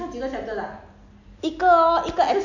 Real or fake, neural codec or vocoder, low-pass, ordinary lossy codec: real; none; 7.2 kHz; none